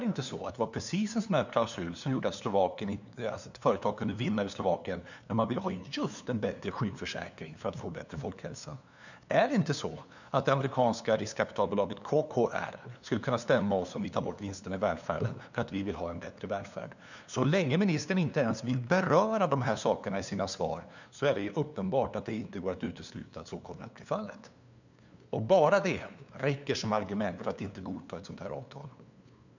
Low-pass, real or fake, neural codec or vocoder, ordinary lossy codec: 7.2 kHz; fake; codec, 16 kHz, 2 kbps, FunCodec, trained on LibriTTS, 25 frames a second; none